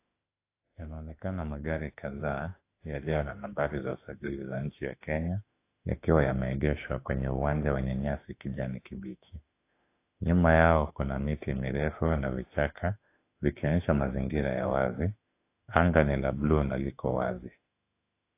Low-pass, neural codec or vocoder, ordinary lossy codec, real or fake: 3.6 kHz; autoencoder, 48 kHz, 32 numbers a frame, DAC-VAE, trained on Japanese speech; AAC, 24 kbps; fake